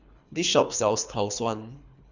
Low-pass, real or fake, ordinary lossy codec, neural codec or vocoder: 7.2 kHz; fake; Opus, 64 kbps; codec, 24 kHz, 3 kbps, HILCodec